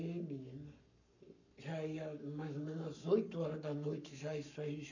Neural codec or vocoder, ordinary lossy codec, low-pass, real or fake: codec, 44.1 kHz, 7.8 kbps, Pupu-Codec; none; 7.2 kHz; fake